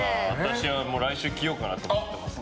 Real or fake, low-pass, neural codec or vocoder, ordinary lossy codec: real; none; none; none